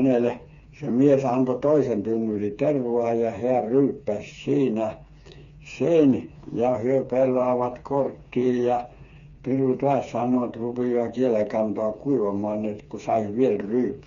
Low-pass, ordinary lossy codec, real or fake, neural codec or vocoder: 7.2 kHz; Opus, 64 kbps; fake; codec, 16 kHz, 4 kbps, FreqCodec, smaller model